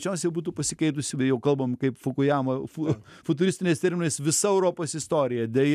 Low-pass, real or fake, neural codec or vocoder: 14.4 kHz; real; none